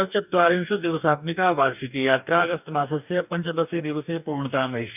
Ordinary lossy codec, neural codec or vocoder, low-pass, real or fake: none; codec, 44.1 kHz, 2.6 kbps, DAC; 3.6 kHz; fake